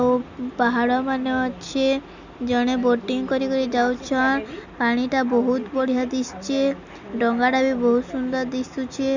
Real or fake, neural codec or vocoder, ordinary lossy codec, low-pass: real; none; none; 7.2 kHz